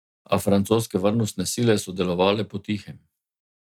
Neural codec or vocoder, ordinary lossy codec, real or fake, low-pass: none; none; real; 19.8 kHz